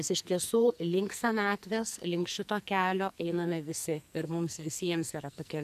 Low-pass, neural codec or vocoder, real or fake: 14.4 kHz; codec, 44.1 kHz, 2.6 kbps, SNAC; fake